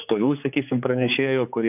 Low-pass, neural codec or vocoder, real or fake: 3.6 kHz; codec, 16 kHz, 4 kbps, X-Codec, HuBERT features, trained on general audio; fake